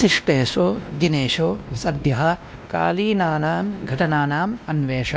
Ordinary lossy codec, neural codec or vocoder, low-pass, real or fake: none; codec, 16 kHz, 1 kbps, X-Codec, WavLM features, trained on Multilingual LibriSpeech; none; fake